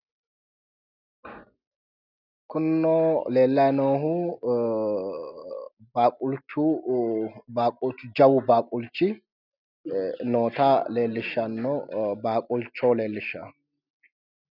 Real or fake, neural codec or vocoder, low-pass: real; none; 5.4 kHz